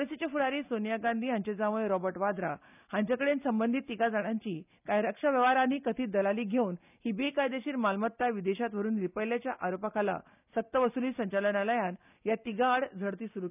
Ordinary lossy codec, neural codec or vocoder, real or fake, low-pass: none; none; real; 3.6 kHz